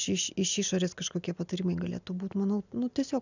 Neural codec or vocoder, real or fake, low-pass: none; real; 7.2 kHz